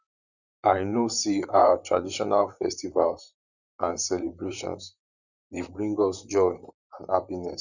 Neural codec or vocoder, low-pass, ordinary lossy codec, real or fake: vocoder, 44.1 kHz, 128 mel bands, Pupu-Vocoder; 7.2 kHz; none; fake